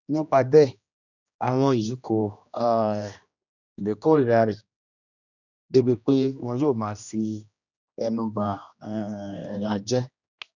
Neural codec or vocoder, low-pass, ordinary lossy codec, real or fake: codec, 16 kHz, 1 kbps, X-Codec, HuBERT features, trained on general audio; 7.2 kHz; none; fake